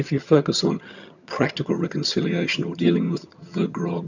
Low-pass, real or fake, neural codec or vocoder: 7.2 kHz; fake; vocoder, 22.05 kHz, 80 mel bands, HiFi-GAN